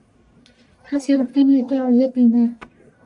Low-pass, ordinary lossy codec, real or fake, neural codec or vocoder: 10.8 kHz; AAC, 64 kbps; fake; codec, 44.1 kHz, 1.7 kbps, Pupu-Codec